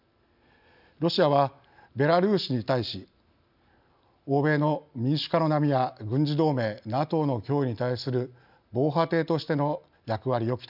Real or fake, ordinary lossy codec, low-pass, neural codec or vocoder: real; none; 5.4 kHz; none